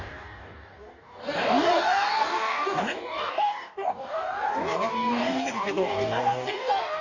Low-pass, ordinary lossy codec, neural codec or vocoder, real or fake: 7.2 kHz; none; codec, 44.1 kHz, 2.6 kbps, DAC; fake